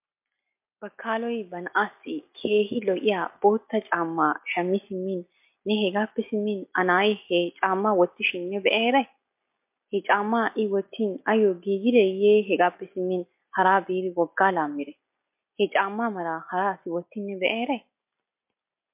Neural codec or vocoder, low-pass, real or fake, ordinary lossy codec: none; 3.6 kHz; real; MP3, 32 kbps